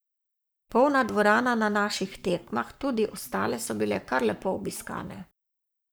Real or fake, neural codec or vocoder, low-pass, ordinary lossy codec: fake; codec, 44.1 kHz, 7.8 kbps, Pupu-Codec; none; none